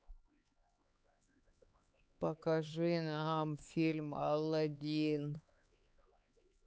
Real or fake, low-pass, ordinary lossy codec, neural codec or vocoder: fake; none; none; codec, 16 kHz, 4 kbps, X-Codec, HuBERT features, trained on LibriSpeech